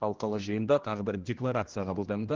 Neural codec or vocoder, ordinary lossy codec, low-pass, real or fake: codec, 16 kHz, 1 kbps, X-Codec, HuBERT features, trained on general audio; Opus, 16 kbps; 7.2 kHz; fake